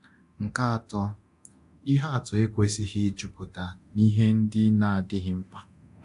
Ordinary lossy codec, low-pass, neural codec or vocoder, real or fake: AAC, 64 kbps; 10.8 kHz; codec, 24 kHz, 0.9 kbps, DualCodec; fake